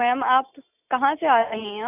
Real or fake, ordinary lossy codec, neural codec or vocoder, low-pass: real; none; none; 3.6 kHz